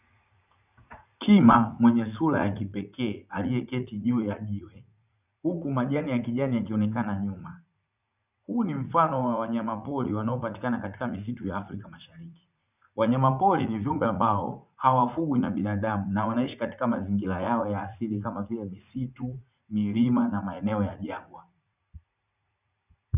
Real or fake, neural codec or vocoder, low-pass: fake; vocoder, 44.1 kHz, 80 mel bands, Vocos; 3.6 kHz